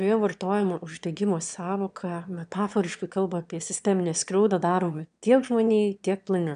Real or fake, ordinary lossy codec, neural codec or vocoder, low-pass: fake; MP3, 96 kbps; autoencoder, 22.05 kHz, a latent of 192 numbers a frame, VITS, trained on one speaker; 9.9 kHz